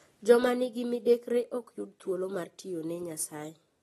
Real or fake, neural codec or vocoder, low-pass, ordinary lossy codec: real; none; 19.8 kHz; AAC, 32 kbps